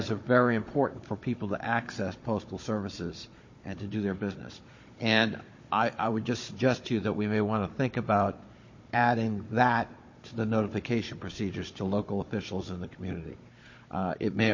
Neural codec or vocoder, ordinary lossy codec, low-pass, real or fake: codec, 16 kHz, 16 kbps, FunCodec, trained on Chinese and English, 50 frames a second; MP3, 32 kbps; 7.2 kHz; fake